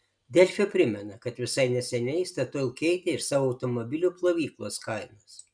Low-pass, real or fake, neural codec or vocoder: 9.9 kHz; real; none